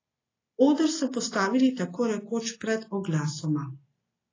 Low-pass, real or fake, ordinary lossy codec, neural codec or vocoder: 7.2 kHz; real; AAC, 32 kbps; none